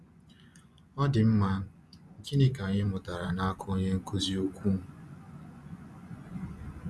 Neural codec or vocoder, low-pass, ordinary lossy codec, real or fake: vocoder, 24 kHz, 100 mel bands, Vocos; none; none; fake